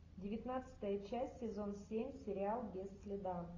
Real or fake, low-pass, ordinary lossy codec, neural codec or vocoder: real; 7.2 kHz; AAC, 32 kbps; none